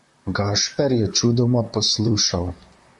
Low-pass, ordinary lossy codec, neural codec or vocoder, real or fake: 10.8 kHz; MP3, 64 kbps; vocoder, 44.1 kHz, 128 mel bands, Pupu-Vocoder; fake